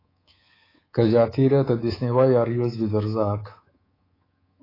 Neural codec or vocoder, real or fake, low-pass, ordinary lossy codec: codec, 24 kHz, 3.1 kbps, DualCodec; fake; 5.4 kHz; AAC, 24 kbps